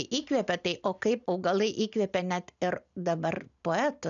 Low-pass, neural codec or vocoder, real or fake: 7.2 kHz; none; real